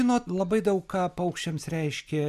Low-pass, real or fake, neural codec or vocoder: 14.4 kHz; real; none